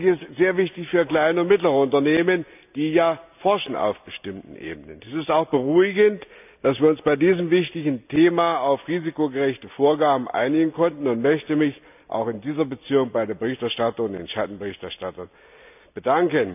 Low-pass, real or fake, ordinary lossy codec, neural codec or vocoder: 3.6 kHz; real; none; none